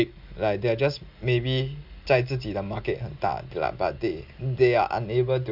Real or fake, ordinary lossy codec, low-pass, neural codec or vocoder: real; AAC, 48 kbps; 5.4 kHz; none